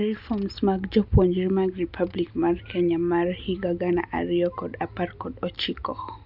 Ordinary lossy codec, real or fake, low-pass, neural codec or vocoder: none; real; 5.4 kHz; none